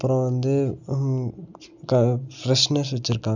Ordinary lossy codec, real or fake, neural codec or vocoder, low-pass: none; real; none; 7.2 kHz